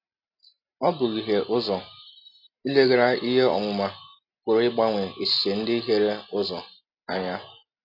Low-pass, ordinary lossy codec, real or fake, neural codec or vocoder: 5.4 kHz; AAC, 48 kbps; real; none